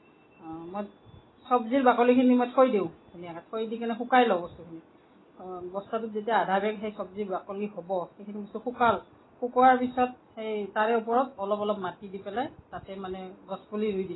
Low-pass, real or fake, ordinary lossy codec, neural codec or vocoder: 7.2 kHz; real; AAC, 16 kbps; none